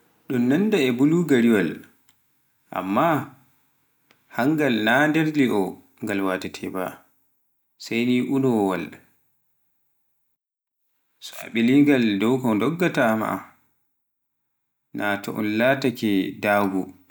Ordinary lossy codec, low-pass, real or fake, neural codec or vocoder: none; none; real; none